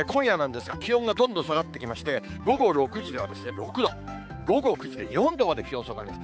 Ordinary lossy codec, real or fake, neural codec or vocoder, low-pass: none; fake; codec, 16 kHz, 4 kbps, X-Codec, HuBERT features, trained on balanced general audio; none